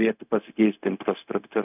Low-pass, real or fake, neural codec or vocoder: 3.6 kHz; fake; codec, 16 kHz, 0.4 kbps, LongCat-Audio-Codec